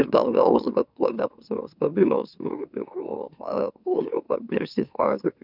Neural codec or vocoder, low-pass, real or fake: autoencoder, 44.1 kHz, a latent of 192 numbers a frame, MeloTTS; 5.4 kHz; fake